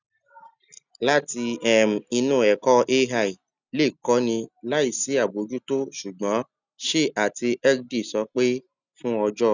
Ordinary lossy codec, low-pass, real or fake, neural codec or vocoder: none; 7.2 kHz; real; none